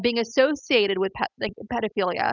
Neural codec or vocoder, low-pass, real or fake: none; 7.2 kHz; real